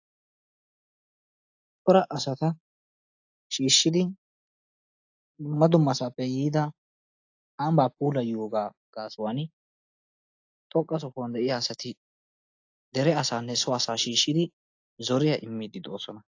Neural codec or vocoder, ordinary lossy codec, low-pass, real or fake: none; AAC, 48 kbps; 7.2 kHz; real